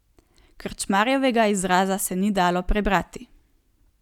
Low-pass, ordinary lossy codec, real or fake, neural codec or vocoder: 19.8 kHz; none; real; none